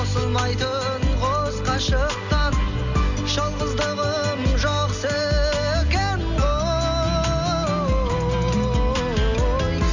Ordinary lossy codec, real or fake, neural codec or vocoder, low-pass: none; real; none; 7.2 kHz